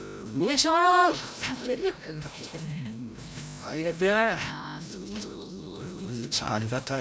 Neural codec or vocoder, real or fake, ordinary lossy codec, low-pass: codec, 16 kHz, 0.5 kbps, FreqCodec, larger model; fake; none; none